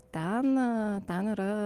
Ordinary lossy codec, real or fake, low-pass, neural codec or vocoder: Opus, 24 kbps; real; 14.4 kHz; none